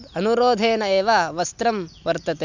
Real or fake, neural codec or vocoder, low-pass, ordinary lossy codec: real; none; 7.2 kHz; none